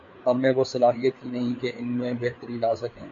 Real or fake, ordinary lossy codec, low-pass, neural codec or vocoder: fake; MP3, 48 kbps; 7.2 kHz; codec, 16 kHz, 4 kbps, FreqCodec, larger model